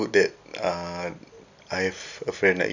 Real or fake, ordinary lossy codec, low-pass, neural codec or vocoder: real; none; 7.2 kHz; none